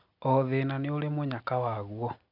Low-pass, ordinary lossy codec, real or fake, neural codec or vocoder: 5.4 kHz; none; real; none